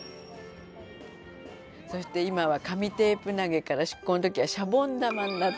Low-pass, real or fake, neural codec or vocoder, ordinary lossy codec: none; real; none; none